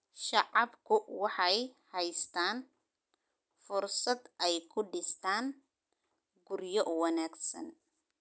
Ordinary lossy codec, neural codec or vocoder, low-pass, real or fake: none; none; none; real